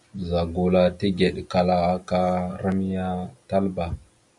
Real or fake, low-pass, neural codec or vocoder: real; 10.8 kHz; none